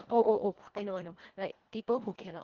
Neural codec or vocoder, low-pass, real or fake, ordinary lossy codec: codec, 24 kHz, 1.5 kbps, HILCodec; 7.2 kHz; fake; Opus, 16 kbps